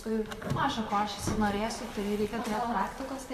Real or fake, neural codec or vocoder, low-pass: fake; vocoder, 44.1 kHz, 128 mel bands, Pupu-Vocoder; 14.4 kHz